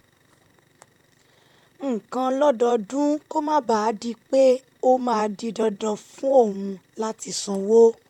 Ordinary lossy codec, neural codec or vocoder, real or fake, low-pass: none; vocoder, 44.1 kHz, 128 mel bands, Pupu-Vocoder; fake; 19.8 kHz